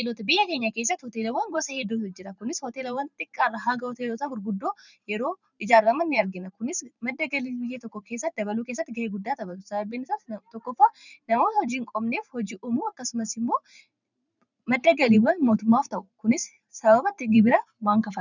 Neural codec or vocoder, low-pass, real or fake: vocoder, 44.1 kHz, 128 mel bands every 512 samples, BigVGAN v2; 7.2 kHz; fake